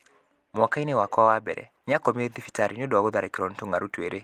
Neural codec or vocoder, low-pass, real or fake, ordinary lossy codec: none; 14.4 kHz; real; Opus, 24 kbps